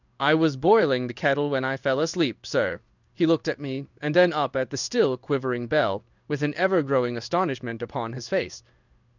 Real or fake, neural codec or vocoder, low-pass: fake; codec, 16 kHz in and 24 kHz out, 1 kbps, XY-Tokenizer; 7.2 kHz